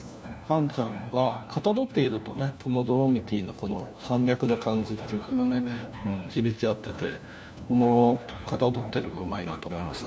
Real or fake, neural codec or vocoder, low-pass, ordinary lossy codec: fake; codec, 16 kHz, 1 kbps, FunCodec, trained on LibriTTS, 50 frames a second; none; none